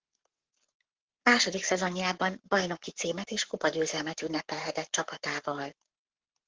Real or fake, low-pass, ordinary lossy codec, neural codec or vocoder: fake; 7.2 kHz; Opus, 16 kbps; codec, 44.1 kHz, 7.8 kbps, Pupu-Codec